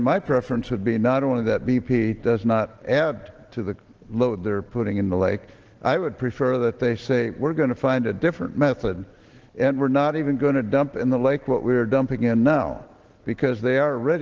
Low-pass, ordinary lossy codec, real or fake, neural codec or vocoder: 7.2 kHz; Opus, 16 kbps; real; none